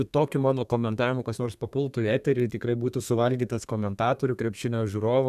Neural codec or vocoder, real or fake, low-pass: codec, 32 kHz, 1.9 kbps, SNAC; fake; 14.4 kHz